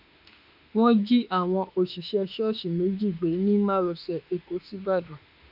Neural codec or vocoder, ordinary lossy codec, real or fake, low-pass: autoencoder, 48 kHz, 32 numbers a frame, DAC-VAE, trained on Japanese speech; none; fake; 5.4 kHz